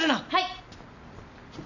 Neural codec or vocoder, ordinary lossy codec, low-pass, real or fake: none; none; 7.2 kHz; real